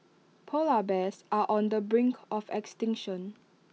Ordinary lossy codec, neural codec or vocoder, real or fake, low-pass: none; none; real; none